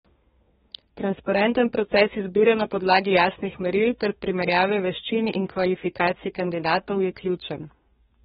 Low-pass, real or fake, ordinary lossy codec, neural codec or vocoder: 14.4 kHz; fake; AAC, 16 kbps; codec, 32 kHz, 1.9 kbps, SNAC